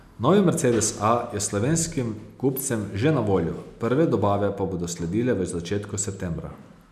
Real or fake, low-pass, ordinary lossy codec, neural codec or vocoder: real; 14.4 kHz; none; none